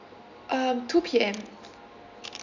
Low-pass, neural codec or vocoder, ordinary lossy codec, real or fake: 7.2 kHz; none; none; real